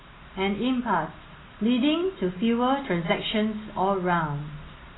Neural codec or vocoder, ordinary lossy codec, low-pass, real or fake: none; AAC, 16 kbps; 7.2 kHz; real